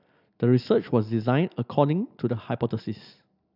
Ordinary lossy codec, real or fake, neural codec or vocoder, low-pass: none; real; none; 5.4 kHz